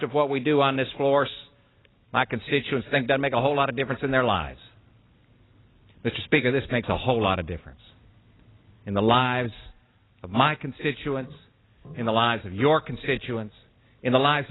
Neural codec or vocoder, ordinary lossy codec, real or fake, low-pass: none; AAC, 16 kbps; real; 7.2 kHz